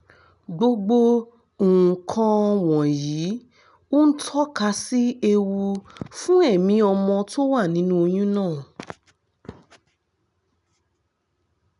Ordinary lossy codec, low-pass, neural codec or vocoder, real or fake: none; 10.8 kHz; none; real